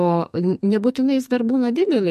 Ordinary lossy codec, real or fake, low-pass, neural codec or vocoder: MP3, 64 kbps; fake; 14.4 kHz; codec, 44.1 kHz, 2.6 kbps, SNAC